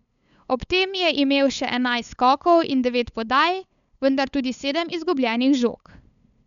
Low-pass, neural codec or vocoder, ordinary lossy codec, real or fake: 7.2 kHz; codec, 16 kHz, 8 kbps, FunCodec, trained on LibriTTS, 25 frames a second; none; fake